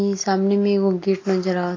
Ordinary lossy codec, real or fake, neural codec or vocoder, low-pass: AAC, 32 kbps; real; none; 7.2 kHz